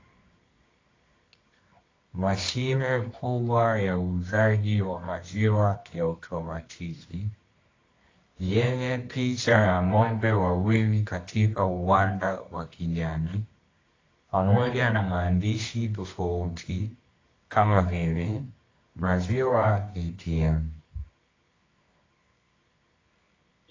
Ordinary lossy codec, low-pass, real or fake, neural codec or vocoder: AAC, 32 kbps; 7.2 kHz; fake; codec, 24 kHz, 0.9 kbps, WavTokenizer, medium music audio release